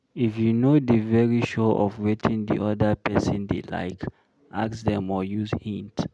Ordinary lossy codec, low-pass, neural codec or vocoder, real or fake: none; none; none; real